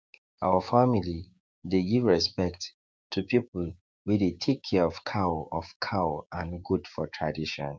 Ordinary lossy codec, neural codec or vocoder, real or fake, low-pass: none; codec, 16 kHz, 6 kbps, DAC; fake; none